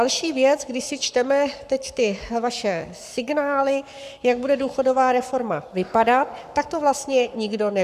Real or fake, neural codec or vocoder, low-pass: fake; codec, 44.1 kHz, 7.8 kbps, Pupu-Codec; 14.4 kHz